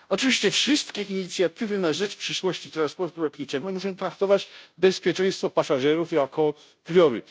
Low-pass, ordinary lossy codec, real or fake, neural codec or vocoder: none; none; fake; codec, 16 kHz, 0.5 kbps, FunCodec, trained on Chinese and English, 25 frames a second